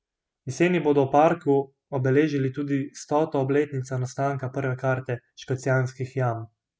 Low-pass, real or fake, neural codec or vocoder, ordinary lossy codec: none; real; none; none